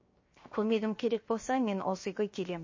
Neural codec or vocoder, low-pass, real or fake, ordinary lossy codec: codec, 16 kHz, 0.7 kbps, FocalCodec; 7.2 kHz; fake; MP3, 32 kbps